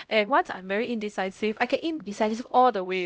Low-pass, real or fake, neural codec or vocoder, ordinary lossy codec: none; fake; codec, 16 kHz, 0.5 kbps, X-Codec, HuBERT features, trained on LibriSpeech; none